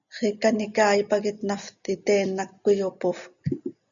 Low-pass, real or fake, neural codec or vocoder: 7.2 kHz; real; none